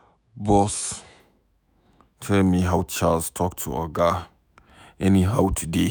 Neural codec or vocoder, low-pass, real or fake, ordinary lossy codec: autoencoder, 48 kHz, 128 numbers a frame, DAC-VAE, trained on Japanese speech; none; fake; none